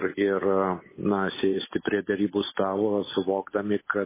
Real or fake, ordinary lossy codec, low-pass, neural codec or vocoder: real; MP3, 16 kbps; 3.6 kHz; none